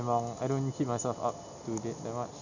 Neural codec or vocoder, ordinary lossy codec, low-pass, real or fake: none; none; 7.2 kHz; real